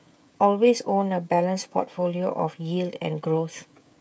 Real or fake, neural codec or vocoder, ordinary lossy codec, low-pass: fake; codec, 16 kHz, 16 kbps, FreqCodec, smaller model; none; none